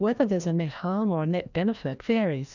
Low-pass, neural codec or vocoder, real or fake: 7.2 kHz; codec, 16 kHz, 1 kbps, FreqCodec, larger model; fake